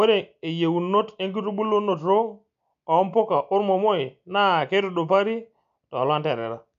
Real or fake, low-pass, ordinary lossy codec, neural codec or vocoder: real; 7.2 kHz; AAC, 96 kbps; none